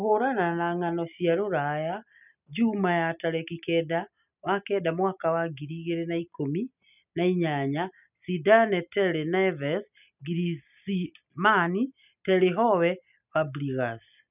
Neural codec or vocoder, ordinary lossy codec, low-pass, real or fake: none; none; 3.6 kHz; real